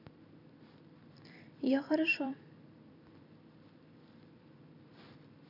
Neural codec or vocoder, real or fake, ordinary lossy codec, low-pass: vocoder, 44.1 kHz, 128 mel bands every 256 samples, BigVGAN v2; fake; none; 5.4 kHz